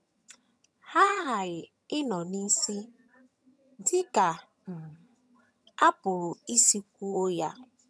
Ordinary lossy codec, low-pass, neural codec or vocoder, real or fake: none; none; vocoder, 22.05 kHz, 80 mel bands, HiFi-GAN; fake